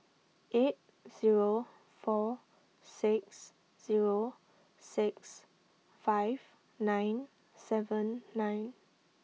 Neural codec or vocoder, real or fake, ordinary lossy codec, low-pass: none; real; none; none